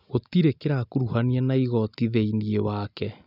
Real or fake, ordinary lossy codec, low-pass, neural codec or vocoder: real; none; 5.4 kHz; none